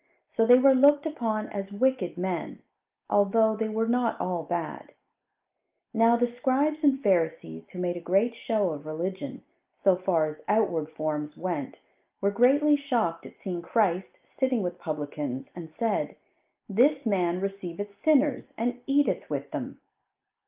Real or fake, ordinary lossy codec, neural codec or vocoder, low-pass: real; Opus, 24 kbps; none; 3.6 kHz